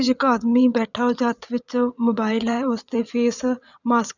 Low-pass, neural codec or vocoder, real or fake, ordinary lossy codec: 7.2 kHz; vocoder, 22.05 kHz, 80 mel bands, WaveNeXt; fake; none